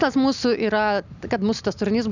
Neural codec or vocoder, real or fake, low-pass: none; real; 7.2 kHz